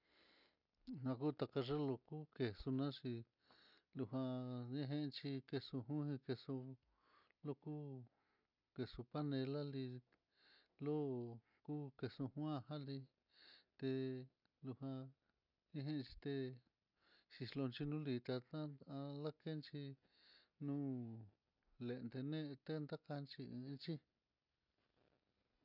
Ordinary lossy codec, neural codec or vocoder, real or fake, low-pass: none; none; real; 5.4 kHz